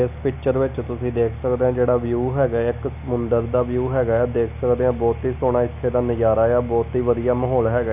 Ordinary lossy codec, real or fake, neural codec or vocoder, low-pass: none; real; none; 3.6 kHz